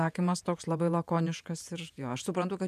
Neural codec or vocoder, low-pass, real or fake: vocoder, 48 kHz, 128 mel bands, Vocos; 14.4 kHz; fake